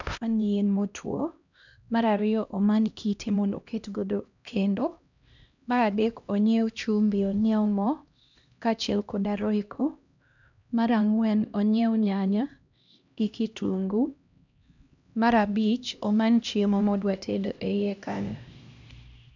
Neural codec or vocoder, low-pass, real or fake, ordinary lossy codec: codec, 16 kHz, 1 kbps, X-Codec, HuBERT features, trained on LibriSpeech; 7.2 kHz; fake; none